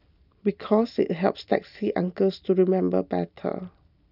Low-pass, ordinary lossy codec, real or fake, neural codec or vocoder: 5.4 kHz; none; real; none